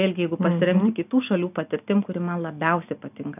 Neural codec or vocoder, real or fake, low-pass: none; real; 3.6 kHz